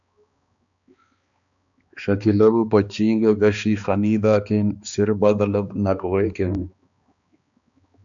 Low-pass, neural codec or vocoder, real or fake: 7.2 kHz; codec, 16 kHz, 2 kbps, X-Codec, HuBERT features, trained on balanced general audio; fake